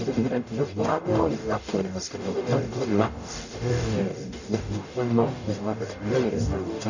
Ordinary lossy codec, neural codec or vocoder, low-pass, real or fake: none; codec, 44.1 kHz, 0.9 kbps, DAC; 7.2 kHz; fake